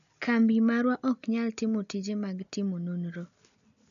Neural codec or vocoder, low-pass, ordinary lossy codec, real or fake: none; 7.2 kHz; none; real